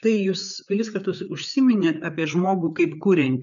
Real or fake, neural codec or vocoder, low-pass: fake; codec, 16 kHz, 4 kbps, FreqCodec, larger model; 7.2 kHz